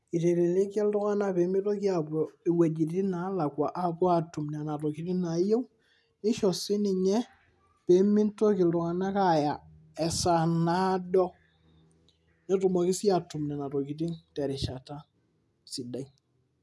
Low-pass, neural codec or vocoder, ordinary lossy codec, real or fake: none; none; none; real